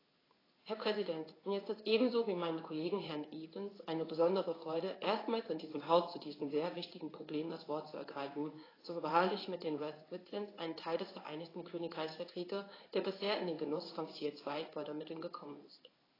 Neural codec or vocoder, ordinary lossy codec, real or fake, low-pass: codec, 16 kHz in and 24 kHz out, 1 kbps, XY-Tokenizer; AAC, 24 kbps; fake; 5.4 kHz